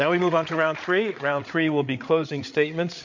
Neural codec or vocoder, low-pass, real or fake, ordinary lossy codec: codec, 16 kHz, 8 kbps, FreqCodec, larger model; 7.2 kHz; fake; MP3, 48 kbps